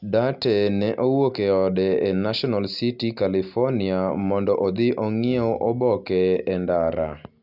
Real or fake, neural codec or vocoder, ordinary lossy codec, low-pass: real; none; none; 5.4 kHz